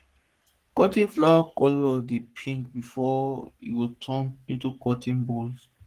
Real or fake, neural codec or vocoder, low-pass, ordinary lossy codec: fake; codec, 44.1 kHz, 3.4 kbps, Pupu-Codec; 14.4 kHz; Opus, 24 kbps